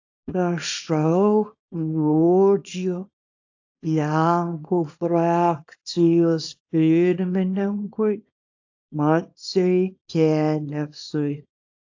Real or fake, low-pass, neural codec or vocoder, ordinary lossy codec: fake; 7.2 kHz; codec, 24 kHz, 0.9 kbps, WavTokenizer, small release; AAC, 48 kbps